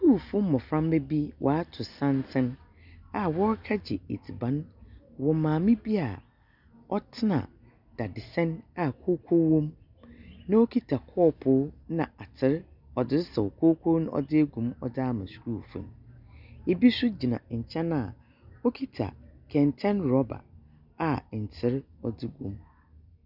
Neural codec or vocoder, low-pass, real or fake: none; 5.4 kHz; real